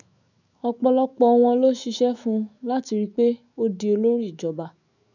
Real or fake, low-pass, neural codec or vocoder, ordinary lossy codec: fake; 7.2 kHz; autoencoder, 48 kHz, 128 numbers a frame, DAC-VAE, trained on Japanese speech; none